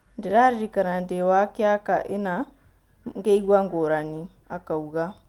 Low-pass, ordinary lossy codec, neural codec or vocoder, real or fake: 19.8 kHz; Opus, 24 kbps; none; real